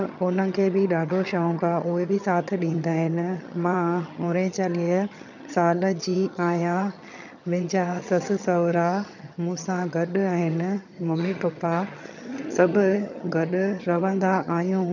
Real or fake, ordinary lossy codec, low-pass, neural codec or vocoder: fake; none; 7.2 kHz; vocoder, 22.05 kHz, 80 mel bands, HiFi-GAN